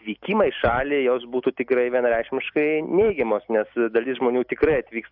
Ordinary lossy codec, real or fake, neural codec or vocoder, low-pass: AAC, 48 kbps; real; none; 5.4 kHz